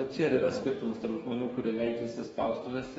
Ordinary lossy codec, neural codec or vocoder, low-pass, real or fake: AAC, 24 kbps; codec, 44.1 kHz, 2.6 kbps, DAC; 19.8 kHz; fake